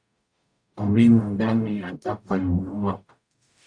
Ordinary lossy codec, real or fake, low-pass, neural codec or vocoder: AAC, 64 kbps; fake; 9.9 kHz; codec, 44.1 kHz, 0.9 kbps, DAC